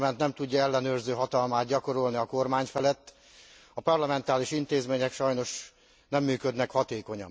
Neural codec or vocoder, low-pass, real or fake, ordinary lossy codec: none; none; real; none